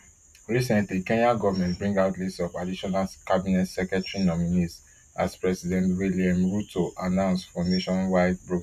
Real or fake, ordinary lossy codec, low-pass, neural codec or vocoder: real; none; 14.4 kHz; none